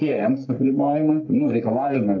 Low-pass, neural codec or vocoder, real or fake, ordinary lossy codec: 7.2 kHz; codec, 44.1 kHz, 3.4 kbps, Pupu-Codec; fake; AAC, 48 kbps